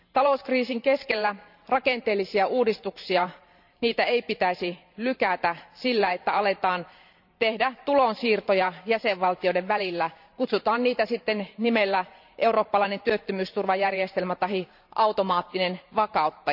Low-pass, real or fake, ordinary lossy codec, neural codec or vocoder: 5.4 kHz; fake; none; vocoder, 44.1 kHz, 128 mel bands every 512 samples, BigVGAN v2